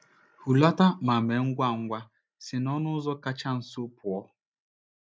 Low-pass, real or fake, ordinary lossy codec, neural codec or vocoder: none; real; none; none